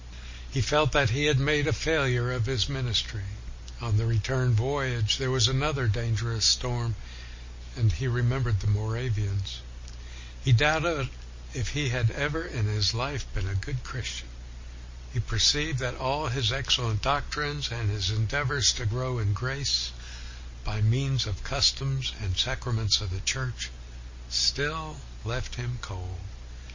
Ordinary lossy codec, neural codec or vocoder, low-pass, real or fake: MP3, 32 kbps; none; 7.2 kHz; real